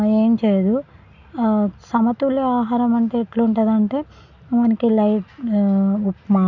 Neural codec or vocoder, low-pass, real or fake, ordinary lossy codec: none; 7.2 kHz; real; none